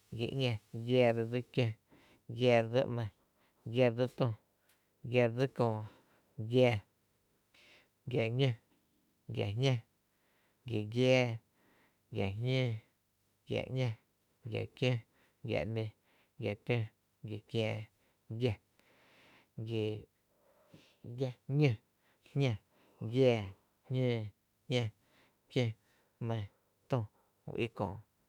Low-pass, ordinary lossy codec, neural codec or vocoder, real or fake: 19.8 kHz; none; autoencoder, 48 kHz, 32 numbers a frame, DAC-VAE, trained on Japanese speech; fake